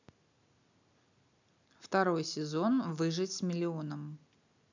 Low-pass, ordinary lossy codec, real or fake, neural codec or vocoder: 7.2 kHz; none; real; none